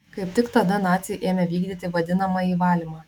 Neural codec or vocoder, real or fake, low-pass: autoencoder, 48 kHz, 128 numbers a frame, DAC-VAE, trained on Japanese speech; fake; 19.8 kHz